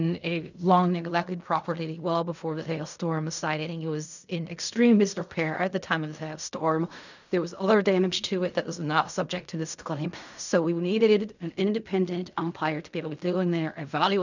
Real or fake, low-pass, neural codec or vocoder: fake; 7.2 kHz; codec, 16 kHz in and 24 kHz out, 0.4 kbps, LongCat-Audio-Codec, fine tuned four codebook decoder